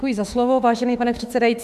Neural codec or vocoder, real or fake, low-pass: autoencoder, 48 kHz, 32 numbers a frame, DAC-VAE, trained on Japanese speech; fake; 14.4 kHz